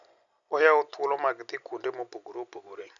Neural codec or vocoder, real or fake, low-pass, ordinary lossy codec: none; real; 7.2 kHz; none